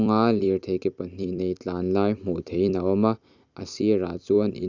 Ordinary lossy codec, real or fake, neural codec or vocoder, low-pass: none; fake; vocoder, 44.1 kHz, 128 mel bands every 256 samples, BigVGAN v2; 7.2 kHz